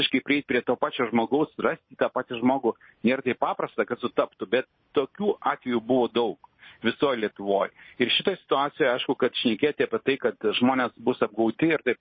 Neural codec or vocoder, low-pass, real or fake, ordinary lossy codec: none; 7.2 kHz; real; MP3, 24 kbps